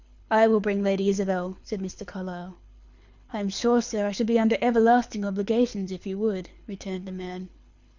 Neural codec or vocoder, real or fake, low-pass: codec, 24 kHz, 6 kbps, HILCodec; fake; 7.2 kHz